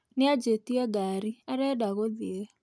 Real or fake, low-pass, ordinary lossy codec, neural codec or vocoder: real; none; none; none